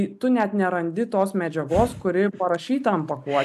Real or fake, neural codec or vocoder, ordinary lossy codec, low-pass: real; none; AAC, 96 kbps; 14.4 kHz